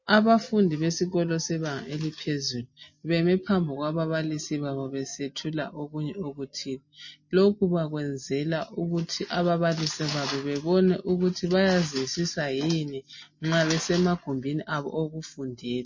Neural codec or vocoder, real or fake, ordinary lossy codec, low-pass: none; real; MP3, 32 kbps; 7.2 kHz